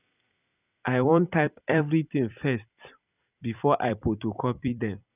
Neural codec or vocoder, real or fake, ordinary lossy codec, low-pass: vocoder, 44.1 kHz, 128 mel bands every 256 samples, BigVGAN v2; fake; none; 3.6 kHz